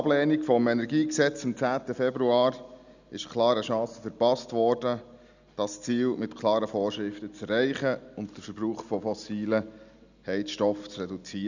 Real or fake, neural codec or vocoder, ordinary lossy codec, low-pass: real; none; none; 7.2 kHz